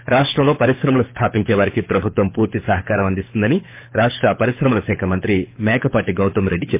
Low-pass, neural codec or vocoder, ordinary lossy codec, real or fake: 3.6 kHz; codec, 24 kHz, 6 kbps, HILCodec; MP3, 24 kbps; fake